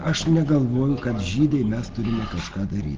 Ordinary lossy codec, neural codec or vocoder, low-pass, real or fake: Opus, 16 kbps; none; 7.2 kHz; real